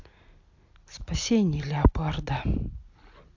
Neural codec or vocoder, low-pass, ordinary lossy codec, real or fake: none; 7.2 kHz; AAC, 48 kbps; real